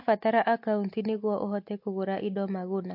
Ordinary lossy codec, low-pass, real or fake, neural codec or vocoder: MP3, 32 kbps; 5.4 kHz; real; none